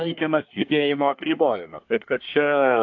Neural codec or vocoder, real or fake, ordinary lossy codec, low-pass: codec, 24 kHz, 1 kbps, SNAC; fake; AAC, 48 kbps; 7.2 kHz